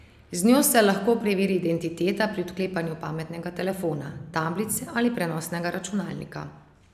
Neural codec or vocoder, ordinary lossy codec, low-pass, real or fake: none; none; 14.4 kHz; real